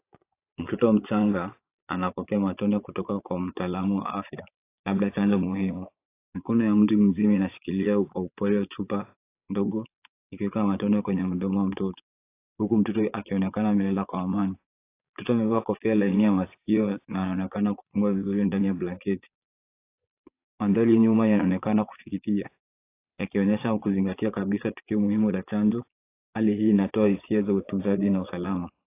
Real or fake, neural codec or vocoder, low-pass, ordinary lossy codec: fake; vocoder, 22.05 kHz, 80 mel bands, Vocos; 3.6 kHz; AAC, 24 kbps